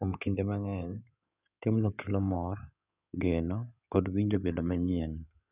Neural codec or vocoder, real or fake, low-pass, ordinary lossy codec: codec, 16 kHz in and 24 kHz out, 2.2 kbps, FireRedTTS-2 codec; fake; 3.6 kHz; none